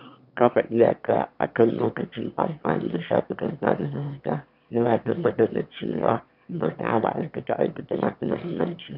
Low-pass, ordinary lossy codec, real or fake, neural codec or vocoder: 5.4 kHz; none; fake; autoencoder, 22.05 kHz, a latent of 192 numbers a frame, VITS, trained on one speaker